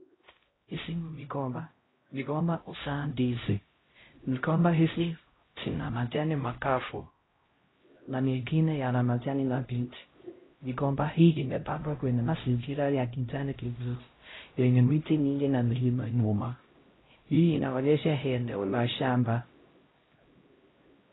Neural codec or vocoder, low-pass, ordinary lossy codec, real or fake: codec, 16 kHz, 0.5 kbps, X-Codec, HuBERT features, trained on LibriSpeech; 7.2 kHz; AAC, 16 kbps; fake